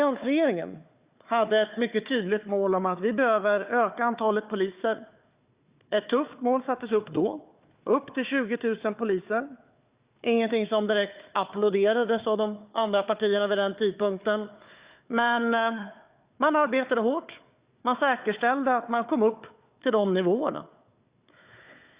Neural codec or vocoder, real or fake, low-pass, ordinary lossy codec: codec, 16 kHz, 4 kbps, FunCodec, trained on Chinese and English, 50 frames a second; fake; 3.6 kHz; Opus, 64 kbps